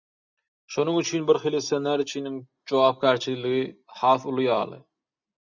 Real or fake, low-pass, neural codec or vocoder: real; 7.2 kHz; none